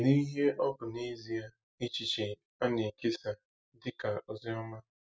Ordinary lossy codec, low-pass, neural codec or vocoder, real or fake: none; none; none; real